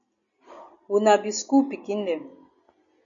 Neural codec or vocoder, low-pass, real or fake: none; 7.2 kHz; real